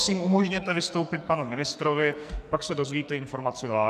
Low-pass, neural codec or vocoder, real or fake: 14.4 kHz; codec, 44.1 kHz, 2.6 kbps, SNAC; fake